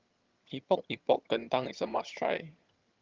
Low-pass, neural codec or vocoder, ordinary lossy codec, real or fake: 7.2 kHz; vocoder, 22.05 kHz, 80 mel bands, HiFi-GAN; Opus, 32 kbps; fake